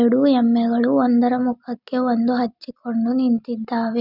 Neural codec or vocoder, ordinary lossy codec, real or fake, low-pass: none; none; real; 5.4 kHz